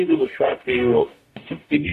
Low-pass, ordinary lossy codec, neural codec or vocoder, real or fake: 14.4 kHz; AAC, 64 kbps; codec, 44.1 kHz, 0.9 kbps, DAC; fake